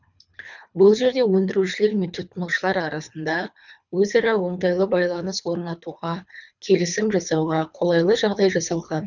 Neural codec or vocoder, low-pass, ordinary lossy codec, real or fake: codec, 24 kHz, 3 kbps, HILCodec; 7.2 kHz; none; fake